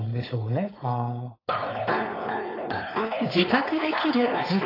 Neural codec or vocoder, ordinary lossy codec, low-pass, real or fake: codec, 16 kHz, 4.8 kbps, FACodec; AAC, 24 kbps; 5.4 kHz; fake